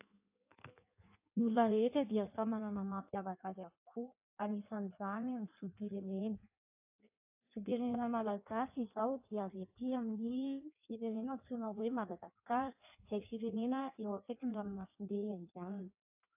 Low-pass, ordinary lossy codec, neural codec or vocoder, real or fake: 3.6 kHz; AAC, 32 kbps; codec, 16 kHz in and 24 kHz out, 1.1 kbps, FireRedTTS-2 codec; fake